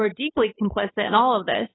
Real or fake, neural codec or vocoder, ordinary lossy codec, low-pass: fake; codec, 16 kHz, 2 kbps, FunCodec, trained on Chinese and English, 25 frames a second; AAC, 16 kbps; 7.2 kHz